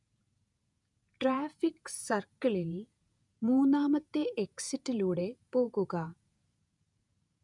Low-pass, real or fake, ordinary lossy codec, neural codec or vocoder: 10.8 kHz; fake; none; vocoder, 48 kHz, 128 mel bands, Vocos